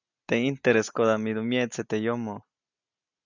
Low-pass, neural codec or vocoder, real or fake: 7.2 kHz; none; real